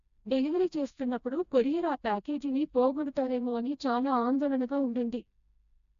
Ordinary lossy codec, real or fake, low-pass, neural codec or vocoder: AAC, 96 kbps; fake; 7.2 kHz; codec, 16 kHz, 1 kbps, FreqCodec, smaller model